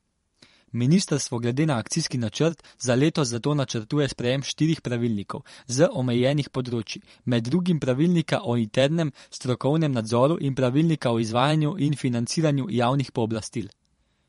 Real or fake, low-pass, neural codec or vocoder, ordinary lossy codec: fake; 19.8 kHz; vocoder, 44.1 kHz, 128 mel bands every 512 samples, BigVGAN v2; MP3, 48 kbps